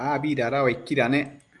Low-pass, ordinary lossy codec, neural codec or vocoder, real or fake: 10.8 kHz; Opus, 32 kbps; none; real